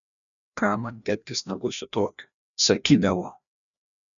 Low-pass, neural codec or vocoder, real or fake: 7.2 kHz; codec, 16 kHz, 1 kbps, FreqCodec, larger model; fake